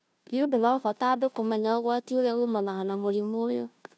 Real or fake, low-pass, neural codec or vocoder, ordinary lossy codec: fake; none; codec, 16 kHz, 0.5 kbps, FunCodec, trained on Chinese and English, 25 frames a second; none